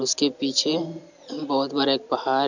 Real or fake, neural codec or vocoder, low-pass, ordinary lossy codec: real; none; 7.2 kHz; none